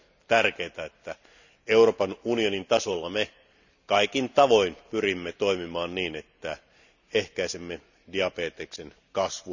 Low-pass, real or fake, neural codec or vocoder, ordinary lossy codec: 7.2 kHz; real; none; none